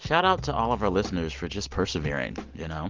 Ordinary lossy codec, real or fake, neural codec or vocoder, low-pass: Opus, 32 kbps; real; none; 7.2 kHz